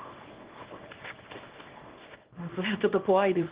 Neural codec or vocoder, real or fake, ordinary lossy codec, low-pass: codec, 24 kHz, 0.9 kbps, WavTokenizer, small release; fake; Opus, 16 kbps; 3.6 kHz